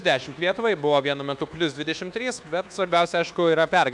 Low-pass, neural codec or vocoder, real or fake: 10.8 kHz; codec, 24 kHz, 1.2 kbps, DualCodec; fake